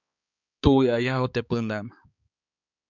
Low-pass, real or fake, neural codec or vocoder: 7.2 kHz; fake; codec, 16 kHz, 4 kbps, X-Codec, HuBERT features, trained on balanced general audio